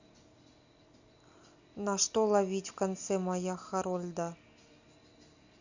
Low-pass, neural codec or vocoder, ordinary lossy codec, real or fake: 7.2 kHz; none; Opus, 64 kbps; real